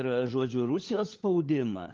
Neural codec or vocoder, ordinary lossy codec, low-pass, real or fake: codec, 16 kHz, 4 kbps, FunCodec, trained on LibriTTS, 50 frames a second; Opus, 32 kbps; 7.2 kHz; fake